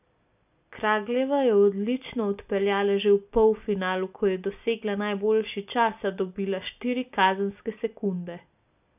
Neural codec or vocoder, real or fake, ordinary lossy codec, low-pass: none; real; none; 3.6 kHz